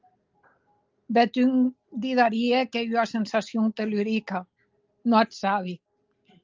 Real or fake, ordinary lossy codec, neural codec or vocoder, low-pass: real; Opus, 24 kbps; none; 7.2 kHz